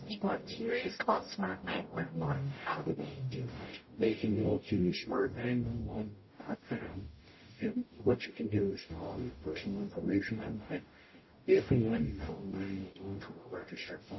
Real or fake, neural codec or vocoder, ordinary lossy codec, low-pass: fake; codec, 44.1 kHz, 0.9 kbps, DAC; MP3, 24 kbps; 7.2 kHz